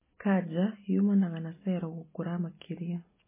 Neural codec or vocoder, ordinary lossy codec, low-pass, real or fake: none; MP3, 16 kbps; 3.6 kHz; real